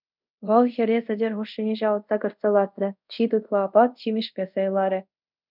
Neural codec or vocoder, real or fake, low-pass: codec, 24 kHz, 0.5 kbps, DualCodec; fake; 5.4 kHz